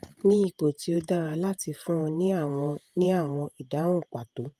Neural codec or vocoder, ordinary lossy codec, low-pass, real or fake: vocoder, 48 kHz, 128 mel bands, Vocos; Opus, 32 kbps; 14.4 kHz; fake